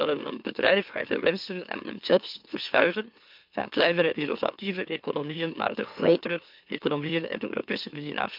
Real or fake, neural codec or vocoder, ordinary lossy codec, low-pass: fake; autoencoder, 44.1 kHz, a latent of 192 numbers a frame, MeloTTS; MP3, 48 kbps; 5.4 kHz